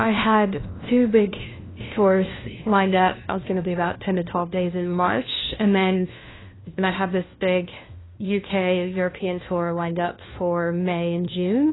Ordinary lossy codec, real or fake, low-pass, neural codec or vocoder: AAC, 16 kbps; fake; 7.2 kHz; codec, 16 kHz, 1 kbps, FunCodec, trained on LibriTTS, 50 frames a second